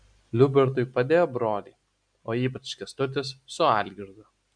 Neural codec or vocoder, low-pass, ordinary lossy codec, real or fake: none; 9.9 kHz; MP3, 96 kbps; real